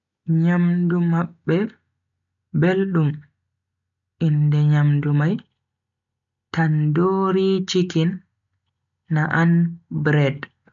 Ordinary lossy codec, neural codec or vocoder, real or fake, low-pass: none; none; real; 7.2 kHz